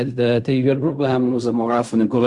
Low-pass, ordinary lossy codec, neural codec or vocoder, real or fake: 10.8 kHz; Opus, 64 kbps; codec, 16 kHz in and 24 kHz out, 0.4 kbps, LongCat-Audio-Codec, fine tuned four codebook decoder; fake